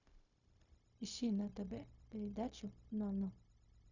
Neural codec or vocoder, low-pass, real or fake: codec, 16 kHz, 0.4 kbps, LongCat-Audio-Codec; 7.2 kHz; fake